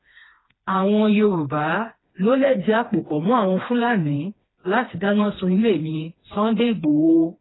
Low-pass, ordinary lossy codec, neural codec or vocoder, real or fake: 7.2 kHz; AAC, 16 kbps; codec, 16 kHz, 2 kbps, FreqCodec, smaller model; fake